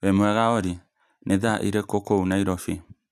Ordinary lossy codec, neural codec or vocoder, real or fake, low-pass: none; none; real; 14.4 kHz